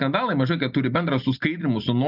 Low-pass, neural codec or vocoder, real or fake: 5.4 kHz; none; real